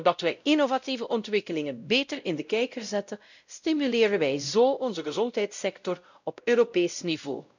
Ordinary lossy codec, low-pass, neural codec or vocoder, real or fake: none; 7.2 kHz; codec, 16 kHz, 0.5 kbps, X-Codec, WavLM features, trained on Multilingual LibriSpeech; fake